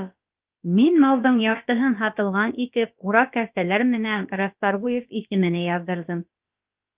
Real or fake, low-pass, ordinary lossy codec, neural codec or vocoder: fake; 3.6 kHz; Opus, 24 kbps; codec, 16 kHz, about 1 kbps, DyCAST, with the encoder's durations